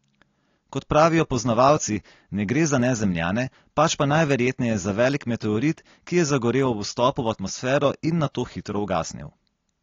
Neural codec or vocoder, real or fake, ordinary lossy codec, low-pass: none; real; AAC, 32 kbps; 7.2 kHz